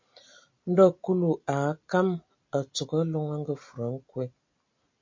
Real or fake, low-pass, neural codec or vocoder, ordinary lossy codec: real; 7.2 kHz; none; MP3, 48 kbps